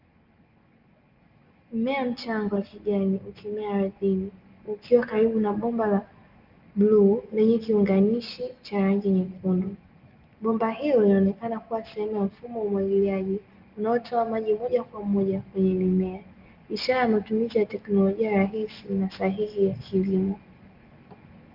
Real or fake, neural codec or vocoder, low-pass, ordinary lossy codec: real; none; 5.4 kHz; Opus, 32 kbps